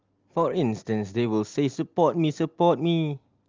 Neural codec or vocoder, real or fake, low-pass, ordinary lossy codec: none; real; 7.2 kHz; Opus, 32 kbps